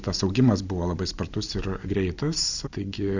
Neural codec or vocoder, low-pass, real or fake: none; 7.2 kHz; real